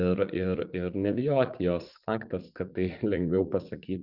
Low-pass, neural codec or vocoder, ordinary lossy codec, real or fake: 5.4 kHz; vocoder, 44.1 kHz, 80 mel bands, Vocos; Opus, 64 kbps; fake